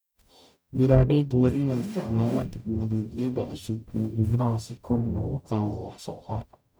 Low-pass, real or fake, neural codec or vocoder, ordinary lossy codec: none; fake; codec, 44.1 kHz, 0.9 kbps, DAC; none